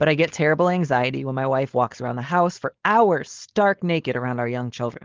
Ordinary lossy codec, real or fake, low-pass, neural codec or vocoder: Opus, 16 kbps; fake; 7.2 kHz; codec, 16 kHz, 4.8 kbps, FACodec